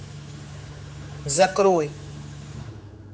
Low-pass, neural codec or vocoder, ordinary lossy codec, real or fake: none; codec, 16 kHz, 4 kbps, X-Codec, HuBERT features, trained on general audio; none; fake